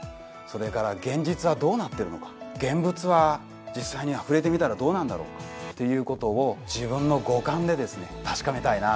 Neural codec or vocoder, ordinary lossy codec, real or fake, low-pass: none; none; real; none